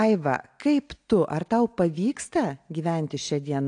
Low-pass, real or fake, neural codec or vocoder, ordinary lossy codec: 9.9 kHz; real; none; AAC, 48 kbps